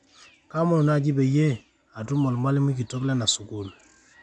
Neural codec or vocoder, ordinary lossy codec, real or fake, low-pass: none; none; real; none